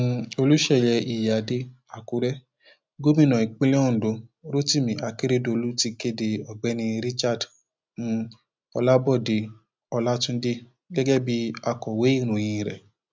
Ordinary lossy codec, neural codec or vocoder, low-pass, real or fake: none; none; none; real